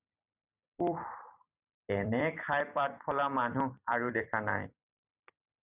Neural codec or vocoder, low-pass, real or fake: vocoder, 44.1 kHz, 128 mel bands every 256 samples, BigVGAN v2; 3.6 kHz; fake